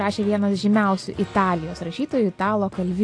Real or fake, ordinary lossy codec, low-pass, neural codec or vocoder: real; AAC, 48 kbps; 9.9 kHz; none